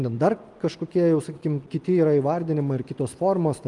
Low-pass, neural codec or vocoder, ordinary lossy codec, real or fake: 10.8 kHz; none; Opus, 32 kbps; real